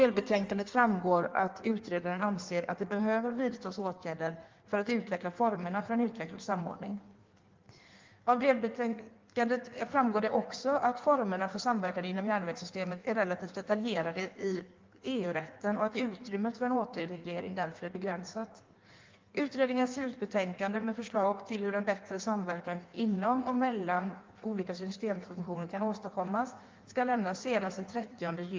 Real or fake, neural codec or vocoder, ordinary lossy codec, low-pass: fake; codec, 16 kHz in and 24 kHz out, 1.1 kbps, FireRedTTS-2 codec; Opus, 32 kbps; 7.2 kHz